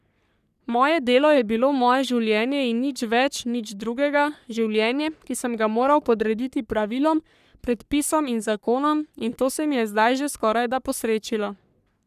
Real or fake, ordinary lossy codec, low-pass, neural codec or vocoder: fake; none; 14.4 kHz; codec, 44.1 kHz, 3.4 kbps, Pupu-Codec